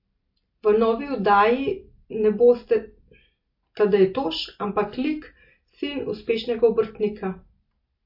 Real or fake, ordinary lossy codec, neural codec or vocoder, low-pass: real; MP3, 32 kbps; none; 5.4 kHz